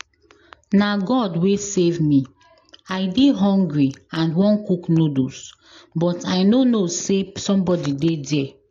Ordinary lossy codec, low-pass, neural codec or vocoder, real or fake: AAC, 48 kbps; 7.2 kHz; none; real